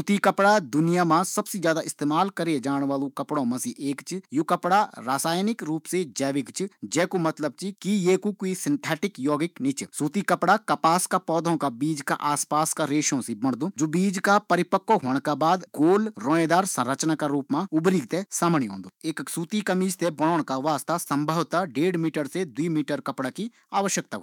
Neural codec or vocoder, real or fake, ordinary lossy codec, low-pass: autoencoder, 48 kHz, 128 numbers a frame, DAC-VAE, trained on Japanese speech; fake; none; 19.8 kHz